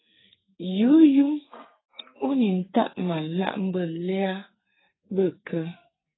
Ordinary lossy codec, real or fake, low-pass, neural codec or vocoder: AAC, 16 kbps; fake; 7.2 kHz; codec, 44.1 kHz, 2.6 kbps, SNAC